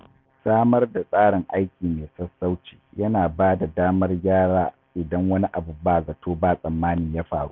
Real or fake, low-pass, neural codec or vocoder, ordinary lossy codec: real; 7.2 kHz; none; none